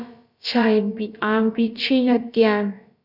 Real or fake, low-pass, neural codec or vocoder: fake; 5.4 kHz; codec, 16 kHz, about 1 kbps, DyCAST, with the encoder's durations